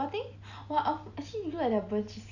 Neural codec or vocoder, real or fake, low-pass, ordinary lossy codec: none; real; 7.2 kHz; none